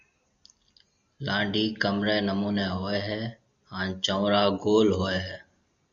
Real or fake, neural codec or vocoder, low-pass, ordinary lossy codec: real; none; 7.2 kHz; Opus, 64 kbps